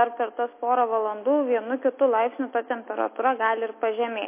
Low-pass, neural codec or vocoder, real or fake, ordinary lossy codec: 3.6 kHz; none; real; MP3, 24 kbps